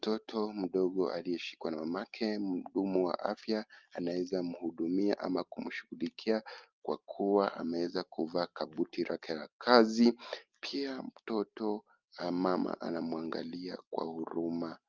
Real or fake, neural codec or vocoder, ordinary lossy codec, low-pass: real; none; Opus, 24 kbps; 7.2 kHz